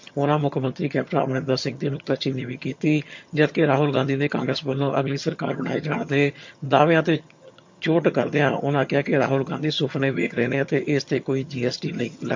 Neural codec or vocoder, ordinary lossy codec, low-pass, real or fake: vocoder, 22.05 kHz, 80 mel bands, HiFi-GAN; MP3, 48 kbps; 7.2 kHz; fake